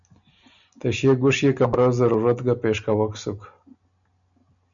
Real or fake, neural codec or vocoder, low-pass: real; none; 7.2 kHz